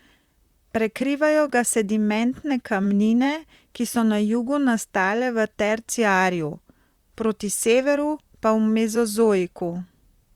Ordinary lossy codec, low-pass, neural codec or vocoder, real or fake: Opus, 64 kbps; 19.8 kHz; vocoder, 44.1 kHz, 128 mel bands, Pupu-Vocoder; fake